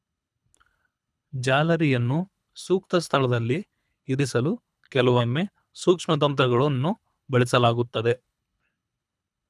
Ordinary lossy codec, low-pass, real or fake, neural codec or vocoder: none; none; fake; codec, 24 kHz, 3 kbps, HILCodec